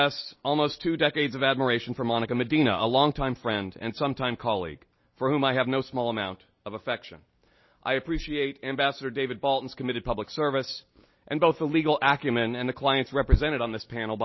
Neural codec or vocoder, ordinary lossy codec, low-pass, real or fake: none; MP3, 24 kbps; 7.2 kHz; real